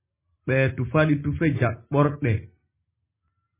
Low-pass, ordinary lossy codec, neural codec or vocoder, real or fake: 3.6 kHz; MP3, 16 kbps; none; real